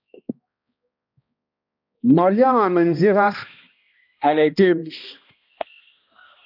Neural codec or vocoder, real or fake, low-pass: codec, 16 kHz, 1 kbps, X-Codec, HuBERT features, trained on balanced general audio; fake; 5.4 kHz